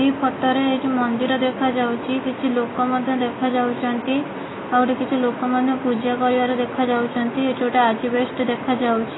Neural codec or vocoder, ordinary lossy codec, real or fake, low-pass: none; AAC, 16 kbps; real; 7.2 kHz